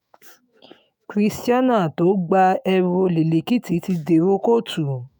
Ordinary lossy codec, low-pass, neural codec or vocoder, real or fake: none; none; autoencoder, 48 kHz, 128 numbers a frame, DAC-VAE, trained on Japanese speech; fake